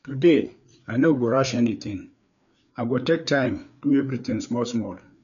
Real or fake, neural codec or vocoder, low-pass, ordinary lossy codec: fake; codec, 16 kHz, 4 kbps, FreqCodec, larger model; 7.2 kHz; none